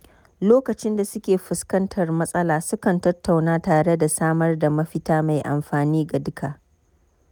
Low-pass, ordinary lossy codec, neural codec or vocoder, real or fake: none; none; none; real